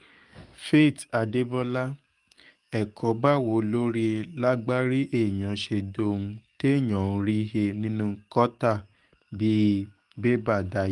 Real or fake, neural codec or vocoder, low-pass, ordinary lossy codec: fake; codec, 44.1 kHz, 7.8 kbps, DAC; 10.8 kHz; Opus, 32 kbps